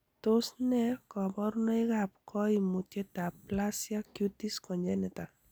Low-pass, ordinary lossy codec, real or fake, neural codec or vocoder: none; none; real; none